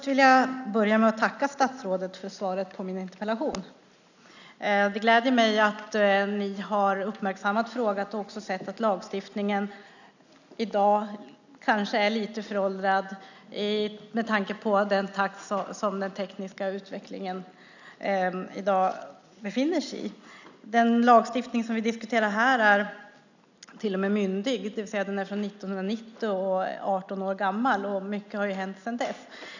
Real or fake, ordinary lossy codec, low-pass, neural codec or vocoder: real; none; 7.2 kHz; none